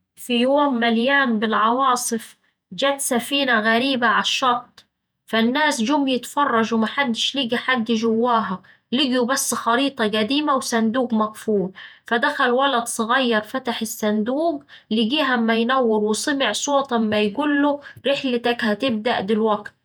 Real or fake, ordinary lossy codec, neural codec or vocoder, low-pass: fake; none; vocoder, 48 kHz, 128 mel bands, Vocos; none